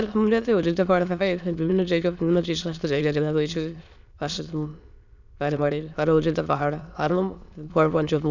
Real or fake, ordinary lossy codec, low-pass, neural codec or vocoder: fake; none; 7.2 kHz; autoencoder, 22.05 kHz, a latent of 192 numbers a frame, VITS, trained on many speakers